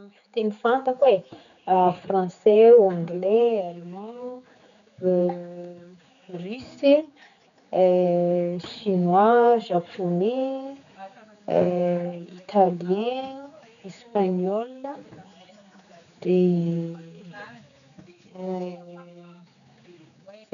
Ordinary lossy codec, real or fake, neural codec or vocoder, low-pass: none; fake; codec, 16 kHz, 4 kbps, X-Codec, HuBERT features, trained on general audio; 7.2 kHz